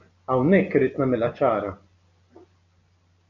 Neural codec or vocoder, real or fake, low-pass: none; real; 7.2 kHz